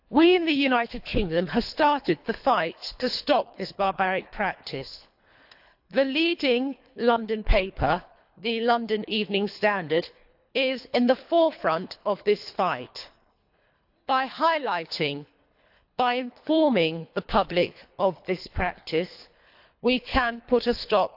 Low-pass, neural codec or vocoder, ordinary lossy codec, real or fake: 5.4 kHz; codec, 24 kHz, 3 kbps, HILCodec; AAC, 48 kbps; fake